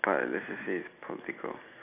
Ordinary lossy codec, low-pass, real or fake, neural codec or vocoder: none; 3.6 kHz; real; none